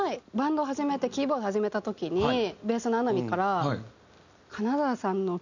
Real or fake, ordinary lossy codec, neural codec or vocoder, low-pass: real; none; none; 7.2 kHz